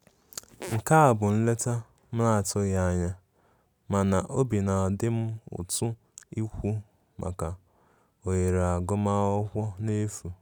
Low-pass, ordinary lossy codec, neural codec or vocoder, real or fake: none; none; none; real